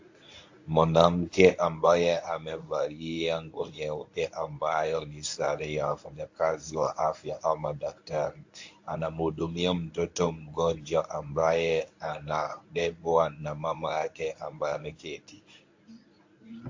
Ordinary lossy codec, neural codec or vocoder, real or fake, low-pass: AAC, 48 kbps; codec, 24 kHz, 0.9 kbps, WavTokenizer, medium speech release version 1; fake; 7.2 kHz